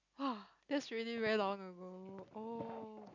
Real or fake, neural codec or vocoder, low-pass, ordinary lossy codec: real; none; 7.2 kHz; none